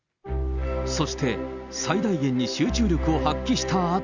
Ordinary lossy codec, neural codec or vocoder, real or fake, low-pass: none; none; real; 7.2 kHz